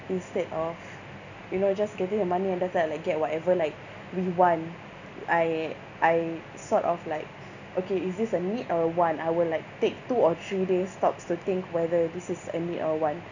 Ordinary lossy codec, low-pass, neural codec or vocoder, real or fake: none; 7.2 kHz; none; real